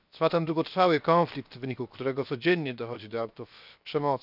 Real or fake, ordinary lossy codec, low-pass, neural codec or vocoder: fake; none; 5.4 kHz; codec, 16 kHz, 0.7 kbps, FocalCodec